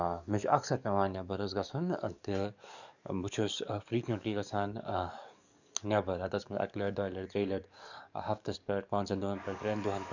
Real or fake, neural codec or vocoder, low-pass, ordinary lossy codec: fake; codec, 44.1 kHz, 7.8 kbps, DAC; 7.2 kHz; none